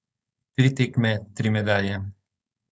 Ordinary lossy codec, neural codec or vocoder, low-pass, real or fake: none; codec, 16 kHz, 4.8 kbps, FACodec; none; fake